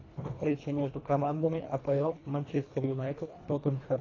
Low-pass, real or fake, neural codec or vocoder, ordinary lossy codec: 7.2 kHz; fake; codec, 24 kHz, 1.5 kbps, HILCodec; AAC, 32 kbps